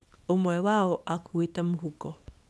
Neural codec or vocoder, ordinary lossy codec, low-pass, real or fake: codec, 24 kHz, 0.9 kbps, WavTokenizer, small release; none; none; fake